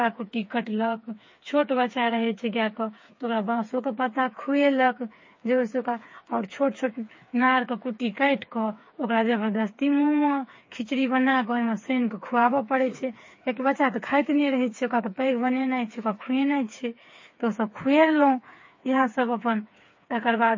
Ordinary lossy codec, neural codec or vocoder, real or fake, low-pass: MP3, 32 kbps; codec, 16 kHz, 4 kbps, FreqCodec, smaller model; fake; 7.2 kHz